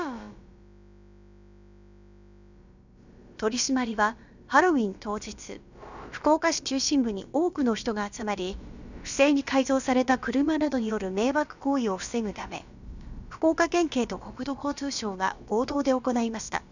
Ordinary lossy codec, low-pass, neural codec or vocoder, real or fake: none; 7.2 kHz; codec, 16 kHz, about 1 kbps, DyCAST, with the encoder's durations; fake